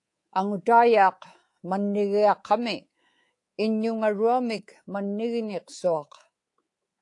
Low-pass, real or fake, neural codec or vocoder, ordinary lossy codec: 10.8 kHz; fake; codec, 24 kHz, 3.1 kbps, DualCodec; AAC, 48 kbps